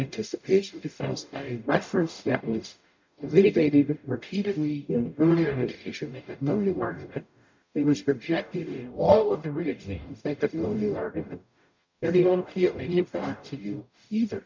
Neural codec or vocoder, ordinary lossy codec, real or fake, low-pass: codec, 44.1 kHz, 0.9 kbps, DAC; MP3, 64 kbps; fake; 7.2 kHz